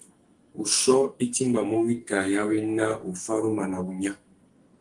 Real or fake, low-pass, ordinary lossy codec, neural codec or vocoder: fake; 10.8 kHz; Opus, 24 kbps; codec, 44.1 kHz, 2.6 kbps, SNAC